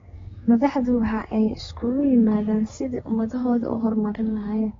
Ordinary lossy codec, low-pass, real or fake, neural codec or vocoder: AAC, 24 kbps; 7.2 kHz; fake; codec, 16 kHz, 4 kbps, X-Codec, HuBERT features, trained on general audio